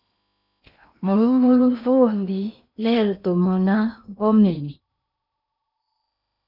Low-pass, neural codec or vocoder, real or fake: 5.4 kHz; codec, 16 kHz in and 24 kHz out, 0.8 kbps, FocalCodec, streaming, 65536 codes; fake